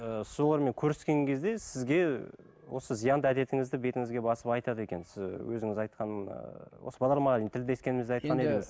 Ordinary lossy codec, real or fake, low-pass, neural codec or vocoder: none; real; none; none